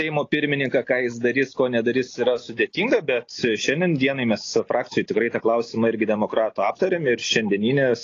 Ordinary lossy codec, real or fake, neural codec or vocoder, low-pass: AAC, 32 kbps; real; none; 7.2 kHz